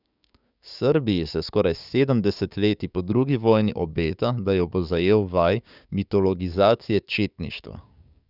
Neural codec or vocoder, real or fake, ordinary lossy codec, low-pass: codec, 16 kHz, 6 kbps, DAC; fake; none; 5.4 kHz